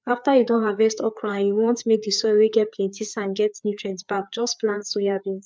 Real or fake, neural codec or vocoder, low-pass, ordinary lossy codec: fake; codec, 16 kHz, 4 kbps, FreqCodec, larger model; none; none